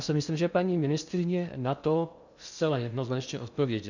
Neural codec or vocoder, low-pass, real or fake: codec, 16 kHz in and 24 kHz out, 0.6 kbps, FocalCodec, streaming, 2048 codes; 7.2 kHz; fake